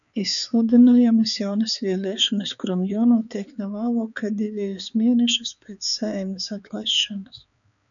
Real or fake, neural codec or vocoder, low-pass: fake; codec, 16 kHz, 4 kbps, X-Codec, HuBERT features, trained on balanced general audio; 7.2 kHz